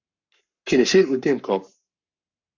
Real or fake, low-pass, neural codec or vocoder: fake; 7.2 kHz; codec, 44.1 kHz, 7.8 kbps, Pupu-Codec